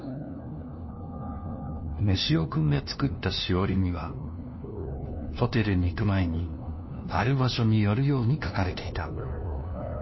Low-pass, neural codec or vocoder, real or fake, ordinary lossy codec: 7.2 kHz; codec, 16 kHz, 1 kbps, FunCodec, trained on LibriTTS, 50 frames a second; fake; MP3, 24 kbps